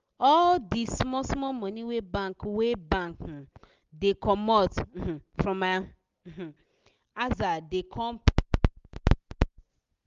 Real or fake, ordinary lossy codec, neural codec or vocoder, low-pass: real; Opus, 32 kbps; none; 7.2 kHz